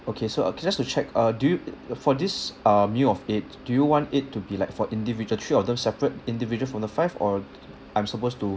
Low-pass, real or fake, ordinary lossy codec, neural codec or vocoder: none; real; none; none